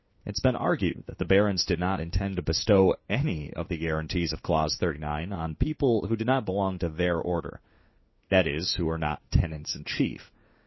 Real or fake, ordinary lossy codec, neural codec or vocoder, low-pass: fake; MP3, 24 kbps; codec, 16 kHz in and 24 kHz out, 1 kbps, XY-Tokenizer; 7.2 kHz